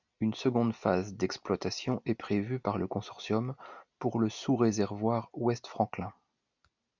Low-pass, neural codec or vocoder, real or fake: 7.2 kHz; none; real